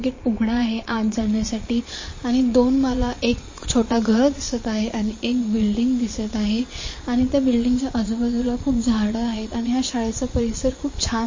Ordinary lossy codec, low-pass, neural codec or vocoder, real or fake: MP3, 32 kbps; 7.2 kHz; vocoder, 22.05 kHz, 80 mel bands, Vocos; fake